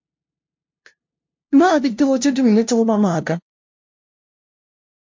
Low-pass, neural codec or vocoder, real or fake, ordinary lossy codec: 7.2 kHz; codec, 16 kHz, 0.5 kbps, FunCodec, trained on LibriTTS, 25 frames a second; fake; MP3, 48 kbps